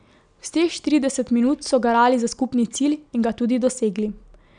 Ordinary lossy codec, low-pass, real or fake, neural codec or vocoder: none; 9.9 kHz; real; none